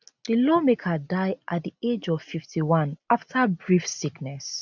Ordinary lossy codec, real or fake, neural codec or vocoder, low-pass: none; real; none; 7.2 kHz